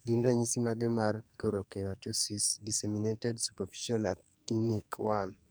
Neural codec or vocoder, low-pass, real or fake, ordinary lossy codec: codec, 44.1 kHz, 2.6 kbps, SNAC; none; fake; none